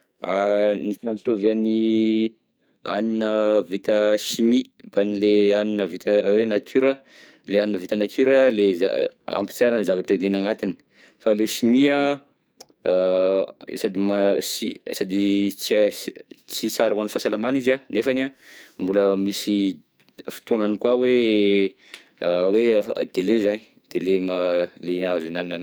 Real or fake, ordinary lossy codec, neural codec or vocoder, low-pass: fake; none; codec, 44.1 kHz, 2.6 kbps, SNAC; none